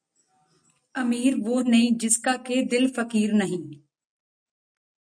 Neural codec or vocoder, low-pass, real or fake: none; 9.9 kHz; real